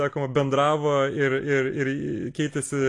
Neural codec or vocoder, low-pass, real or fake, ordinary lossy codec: none; 10.8 kHz; real; AAC, 48 kbps